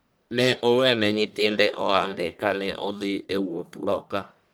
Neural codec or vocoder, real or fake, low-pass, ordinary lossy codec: codec, 44.1 kHz, 1.7 kbps, Pupu-Codec; fake; none; none